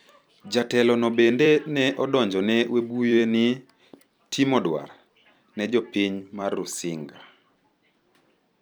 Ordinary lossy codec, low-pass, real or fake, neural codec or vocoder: none; none; fake; vocoder, 44.1 kHz, 128 mel bands every 256 samples, BigVGAN v2